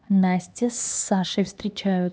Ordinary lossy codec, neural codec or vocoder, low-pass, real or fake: none; codec, 16 kHz, 2 kbps, X-Codec, HuBERT features, trained on LibriSpeech; none; fake